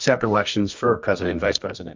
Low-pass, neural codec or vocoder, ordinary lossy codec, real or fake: 7.2 kHz; codec, 24 kHz, 0.9 kbps, WavTokenizer, medium music audio release; MP3, 48 kbps; fake